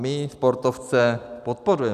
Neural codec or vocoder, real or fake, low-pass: none; real; 14.4 kHz